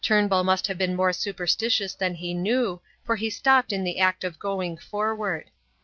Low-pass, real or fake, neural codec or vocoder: 7.2 kHz; real; none